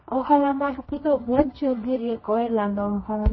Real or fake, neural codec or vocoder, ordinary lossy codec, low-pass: fake; codec, 24 kHz, 0.9 kbps, WavTokenizer, medium music audio release; MP3, 24 kbps; 7.2 kHz